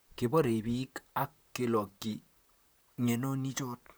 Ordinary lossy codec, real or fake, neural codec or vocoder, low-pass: none; fake; vocoder, 44.1 kHz, 128 mel bands, Pupu-Vocoder; none